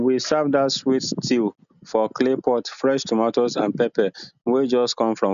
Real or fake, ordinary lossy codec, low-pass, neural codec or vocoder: real; none; 7.2 kHz; none